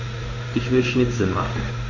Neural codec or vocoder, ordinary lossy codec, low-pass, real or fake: codec, 16 kHz in and 24 kHz out, 1 kbps, XY-Tokenizer; MP3, 32 kbps; 7.2 kHz; fake